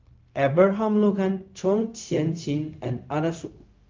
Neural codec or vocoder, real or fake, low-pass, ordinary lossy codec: codec, 16 kHz, 0.4 kbps, LongCat-Audio-Codec; fake; 7.2 kHz; Opus, 32 kbps